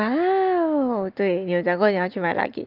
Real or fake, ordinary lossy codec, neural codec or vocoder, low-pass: real; Opus, 32 kbps; none; 5.4 kHz